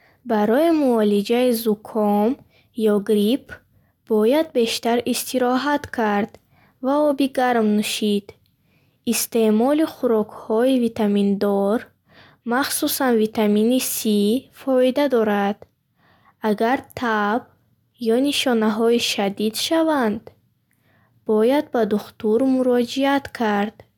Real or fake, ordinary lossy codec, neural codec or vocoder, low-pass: real; none; none; 19.8 kHz